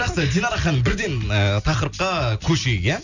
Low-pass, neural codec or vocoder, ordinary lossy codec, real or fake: 7.2 kHz; none; none; real